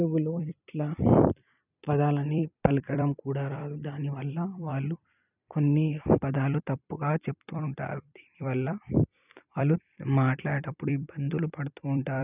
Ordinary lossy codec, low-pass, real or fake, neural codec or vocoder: none; 3.6 kHz; fake; vocoder, 44.1 kHz, 128 mel bands every 256 samples, BigVGAN v2